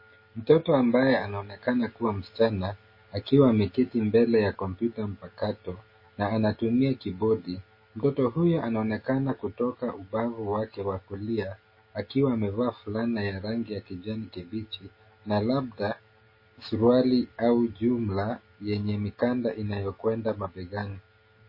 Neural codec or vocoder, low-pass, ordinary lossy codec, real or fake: none; 5.4 kHz; MP3, 24 kbps; real